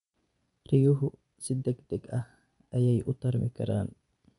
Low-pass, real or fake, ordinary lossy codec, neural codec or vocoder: 10.8 kHz; fake; none; vocoder, 24 kHz, 100 mel bands, Vocos